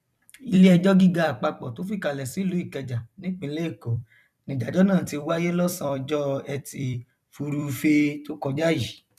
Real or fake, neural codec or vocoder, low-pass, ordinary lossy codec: fake; vocoder, 44.1 kHz, 128 mel bands every 256 samples, BigVGAN v2; 14.4 kHz; none